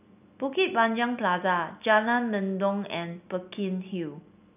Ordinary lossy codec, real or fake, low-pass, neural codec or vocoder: none; real; 3.6 kHz; none